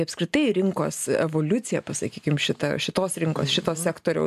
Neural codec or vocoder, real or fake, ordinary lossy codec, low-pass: none; real; AAC, 96 kbps; 14.4 kHz